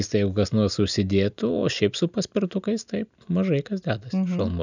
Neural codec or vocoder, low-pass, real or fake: none; 7.2 kHz; real